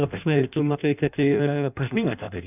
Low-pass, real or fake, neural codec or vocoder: 3.6 kHz; fake; codec, 16 kHz in and 24 kHz out, 0.6 kbps, FireRedTTS-2 codec